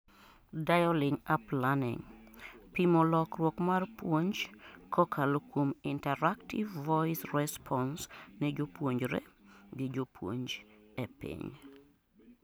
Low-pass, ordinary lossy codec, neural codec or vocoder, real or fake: none; none; none; real